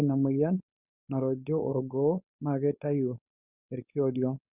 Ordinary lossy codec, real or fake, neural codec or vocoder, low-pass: Opus, 64 kbps; fake; codec, 16 kHz, 4.8 kbps, FACodec; 3.6 kHz